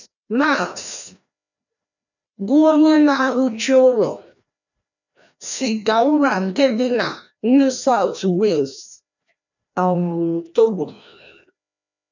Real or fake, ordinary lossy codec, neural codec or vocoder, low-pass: fake; none; codec, 16 kHz, 1 kbps, FreqCodec, larger model; 7.2 kHz